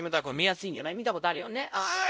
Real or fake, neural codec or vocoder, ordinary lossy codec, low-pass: fake; codec, 16 kHz, 0.5 kbps, X-Codec, WavLM features, trained on Multilingual LibriSpeech; none; none